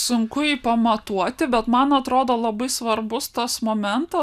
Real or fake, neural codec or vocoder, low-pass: real; none; 14.4 kHz